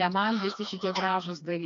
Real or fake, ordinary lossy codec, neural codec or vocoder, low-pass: fake; MP3, 48 kbps; codec, 16 kHz, 2 kbps, FreqCodec, smaller model; 7.2 kHz